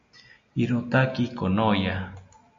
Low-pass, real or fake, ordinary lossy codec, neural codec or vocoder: 7.2 kHz; real; MP3, 48 kbps; none